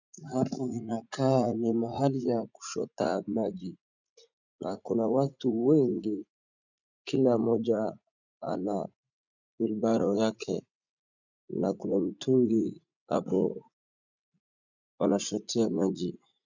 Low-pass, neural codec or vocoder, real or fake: 7.2 kHz; vocoder, 44.1 kHz, 80 mel bands, Vocos; fake